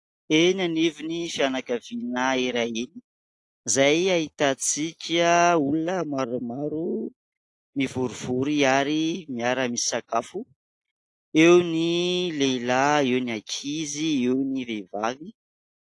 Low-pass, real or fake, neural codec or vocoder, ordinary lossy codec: 10.8 kHz; real; none; AAC, 48 kbps